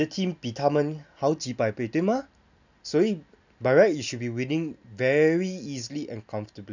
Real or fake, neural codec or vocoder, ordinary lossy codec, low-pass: real; none; none; 7.2 kHz